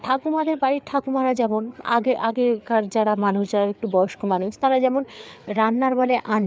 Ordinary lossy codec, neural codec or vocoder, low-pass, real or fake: none; codec, 16 kHz, 4 kbps, FreqCodec, larger model; none; fake